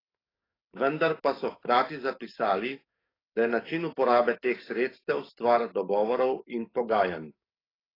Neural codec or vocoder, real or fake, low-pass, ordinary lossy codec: codec, 44.1 kHz, 7.8 kbps, DAC; fake; 5.4 kHz; AAC, 24 kbps